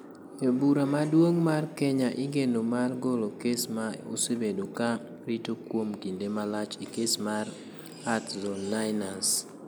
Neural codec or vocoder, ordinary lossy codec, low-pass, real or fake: none; none; none; real